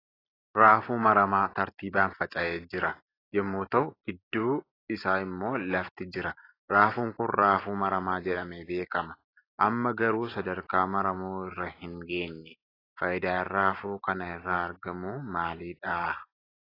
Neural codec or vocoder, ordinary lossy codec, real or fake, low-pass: none; AAC, 24 kbps; real; 5.4 kHz